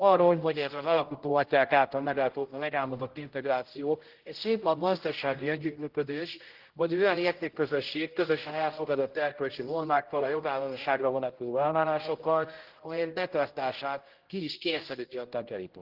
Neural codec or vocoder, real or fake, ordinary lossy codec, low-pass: codec, 16 kHz, 0.5 kbps, X-Codec, HuBERT features, trained on general audio; fake; Opus, 16 kbps; 5.4 kHz